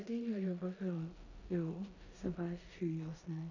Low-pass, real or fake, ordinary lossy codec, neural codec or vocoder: 7.2 kHz; fake; none; codec, 16 kHz in and 24 kHz out, 0.9 kbps, LongCat-Audio-Codec, four codebook decoder